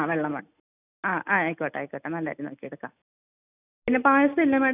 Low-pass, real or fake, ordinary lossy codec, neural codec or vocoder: 3.6 kHz; real; none; none